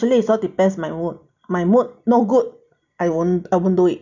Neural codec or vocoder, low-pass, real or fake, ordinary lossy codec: none; 7.2 kHz; real; none